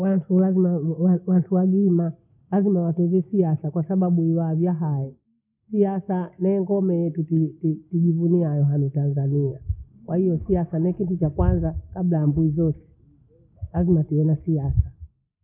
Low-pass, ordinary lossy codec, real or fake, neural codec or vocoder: 3.6 kHz; none; real; none